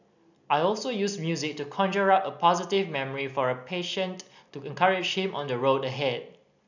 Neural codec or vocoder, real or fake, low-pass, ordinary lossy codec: none; real; 7.2 kHz; none